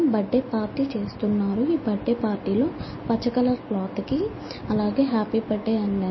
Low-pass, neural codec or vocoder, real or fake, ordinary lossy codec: 7.2 kHz; none; real; MP3, 24 kbps